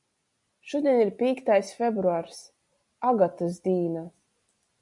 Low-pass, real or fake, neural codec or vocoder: 10.8 kHz; real; none